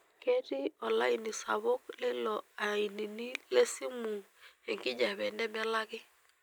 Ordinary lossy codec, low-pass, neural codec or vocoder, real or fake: none; none; none; real